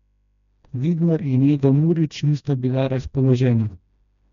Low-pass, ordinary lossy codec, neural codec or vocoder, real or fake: 7.2 kHz; none; codec, 16 kHz, 1 kbps, FreqCodec, smaller model; fake